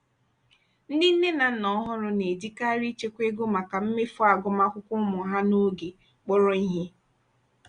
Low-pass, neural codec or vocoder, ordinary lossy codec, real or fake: 9.9 kHz; none; Opus, 64 kbps; real